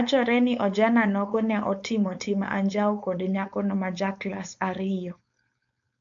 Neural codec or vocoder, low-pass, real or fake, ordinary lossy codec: codec, 16 kHz, 4.8 kbps, FACodec; 7.2 kHz; fake; AAC, 64 kbps